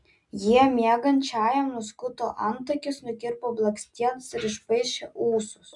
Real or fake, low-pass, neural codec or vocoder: real; 10.8 kHz; none